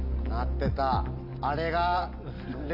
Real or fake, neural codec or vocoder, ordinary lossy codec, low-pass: real; none; none; 5.4 kHz